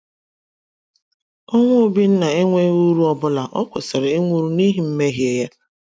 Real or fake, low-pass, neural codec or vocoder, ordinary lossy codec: real; none; none; none